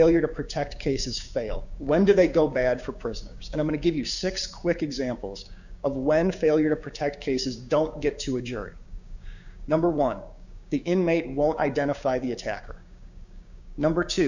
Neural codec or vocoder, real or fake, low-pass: codec, 16 kHz, 6 kbps, DAC; fake; 7.2 kHz